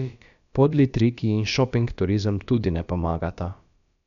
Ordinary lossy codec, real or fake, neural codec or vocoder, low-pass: none; fake; codec, 16 kHz, about 1 kbps, DyCAST, with the encoder's durations; 7.2 kHz